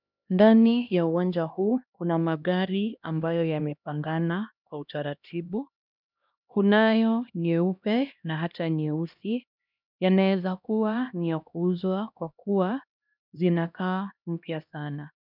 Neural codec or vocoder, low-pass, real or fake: codec, 16 kHz, 1 kbps, X-Codec, HuBERT features, trained on LibriSpeech; 5.4 kHz; fake